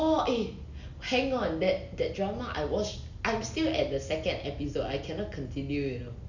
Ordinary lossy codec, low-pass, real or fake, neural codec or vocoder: none; 7.2 kHz; real; none